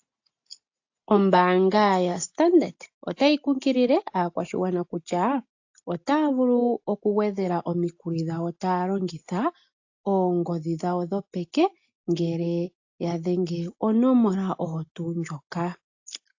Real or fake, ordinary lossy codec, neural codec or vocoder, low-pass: real; AAC, 48 kbps; none; 7.2 kHz